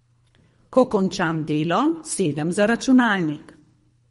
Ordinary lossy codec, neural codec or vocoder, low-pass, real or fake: MP3, 48 kbps; codec, 24 kHz, 3 kbps, HILCodec; 10.8 kHz; fake